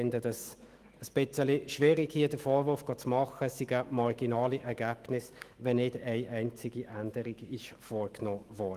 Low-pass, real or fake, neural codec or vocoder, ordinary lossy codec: 14.4 kHz; fake; autoencoder, 48 kHz, 128 numbers a frame, DAC-VAE, trained on Japanese speech; Opus, 24 kbps